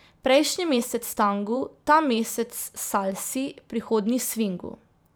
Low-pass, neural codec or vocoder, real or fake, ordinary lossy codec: none; vocoder, 44.1 kHz, 128 mel bands every 512 samples, BigVGAN v2; fake; none